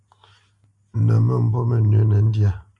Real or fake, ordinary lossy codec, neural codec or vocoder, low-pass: real; AAC, 64 kbps; none; 10.8 kHz